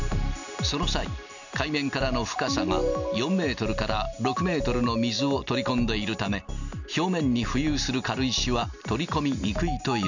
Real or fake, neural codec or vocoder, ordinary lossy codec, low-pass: real; none; none; 7.2 kHz